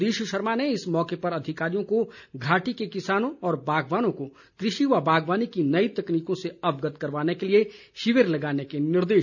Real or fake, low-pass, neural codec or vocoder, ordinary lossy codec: real; 7.2 kHz; none; none